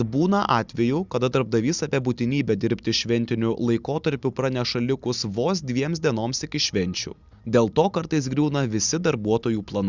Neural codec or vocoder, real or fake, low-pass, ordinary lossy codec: none; real; 7.2 kHz; Opus, 64 kbps